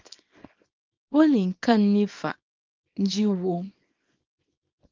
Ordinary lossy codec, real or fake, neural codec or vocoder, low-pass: Opus, 32 kbps; fake; codec, 24 kHz, 0.9 kbps, WavTokenizer, small release; 7.2 kHz